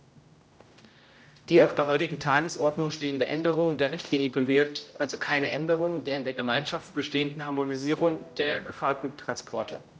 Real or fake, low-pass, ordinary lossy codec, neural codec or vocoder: fake; none; none; codec, 16 kHz, 0.5 kbps, X-Codec, HuBERT features, trained on general audio